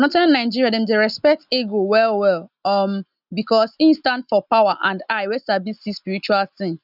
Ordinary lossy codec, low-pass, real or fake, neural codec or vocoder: none; 5.4 kHz; real; none